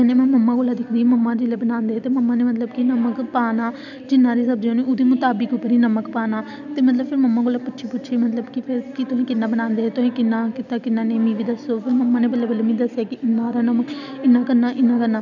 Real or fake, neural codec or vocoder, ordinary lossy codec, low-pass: real; none; none; 7.2 kHz